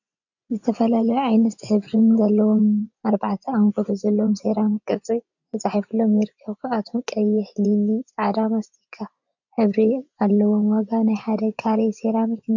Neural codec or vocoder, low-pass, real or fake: vocoder, 24 kHz, 100 mel bands, Vocos; 7.2 kHz; fake